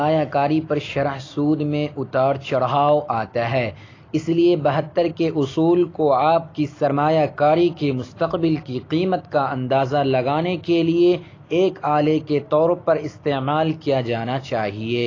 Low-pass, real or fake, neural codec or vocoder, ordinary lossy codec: 7.2 kHz; real; none; AAC, 32 kbps